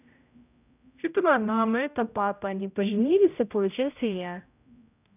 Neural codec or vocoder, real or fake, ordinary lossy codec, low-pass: codec, 16 kHz, 0.5 kbps, X-Codec, HuBERT features, trained on general audio; fake; none; 3.6 kHz